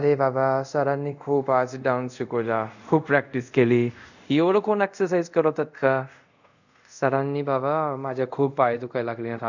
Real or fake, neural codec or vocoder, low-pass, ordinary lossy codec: fake; codec, 24 kHz, 0.5 kbps, DualCodec; 7.2 kHz; none